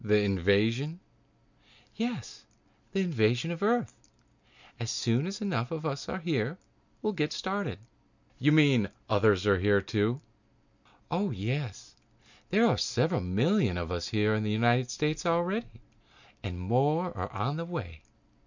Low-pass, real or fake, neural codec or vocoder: 7.2 kHz; real; none